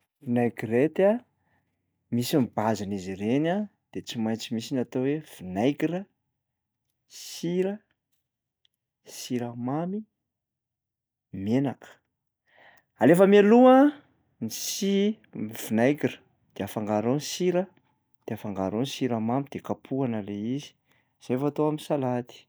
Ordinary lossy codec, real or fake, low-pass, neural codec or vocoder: none; real; none; none